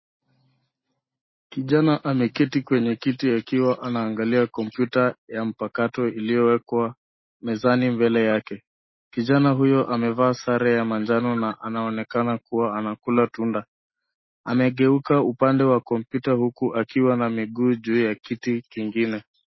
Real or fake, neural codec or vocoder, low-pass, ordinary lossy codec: real; none; 7.2 kHz; MP3, 24 kbps